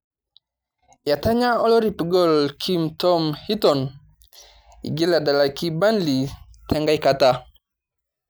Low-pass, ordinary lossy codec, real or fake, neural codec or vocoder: none; none; real; none